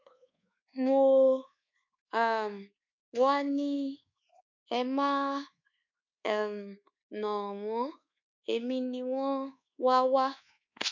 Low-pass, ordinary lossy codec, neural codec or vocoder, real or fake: 7.2 kHz; MP3, 64 kbps; codec, 24 kHz, 1.2 kbps, DualCodec; fake